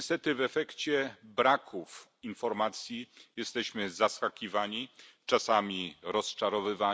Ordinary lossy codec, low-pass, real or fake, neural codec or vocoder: none; none; real; none